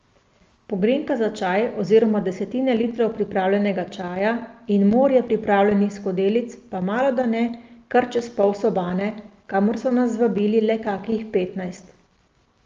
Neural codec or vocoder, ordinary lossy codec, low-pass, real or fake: none; Opus, 32 kbps; 7.2 kHz; real